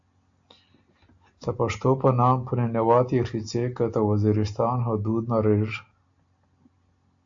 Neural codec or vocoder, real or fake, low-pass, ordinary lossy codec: none; real; 7.2 kHz; AAC, 64 kbps